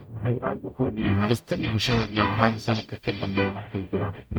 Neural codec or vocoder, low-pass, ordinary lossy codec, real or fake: codec, 44.1 kHz, 0.9 kbps, DAC; none; none; fake